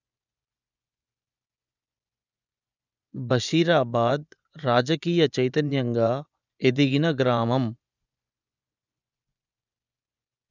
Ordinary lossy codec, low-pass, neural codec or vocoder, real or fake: none; 7.2 kHz; vocoder, 24 kHz, 100 mel bands, Vocos; fake